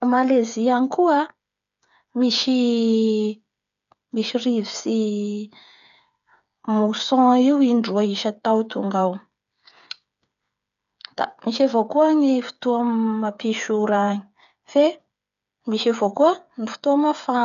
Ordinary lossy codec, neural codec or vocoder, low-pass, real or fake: none; codec, 16 kHz, 8 kbps, FreqCodec, smaller model; 7.2 kHz; fake